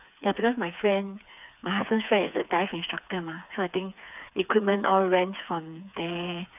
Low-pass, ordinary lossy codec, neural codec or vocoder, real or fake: 3.6 kHz; none; codec, 16 kHz, 4 kbps, FreqCodec, smaller model; fake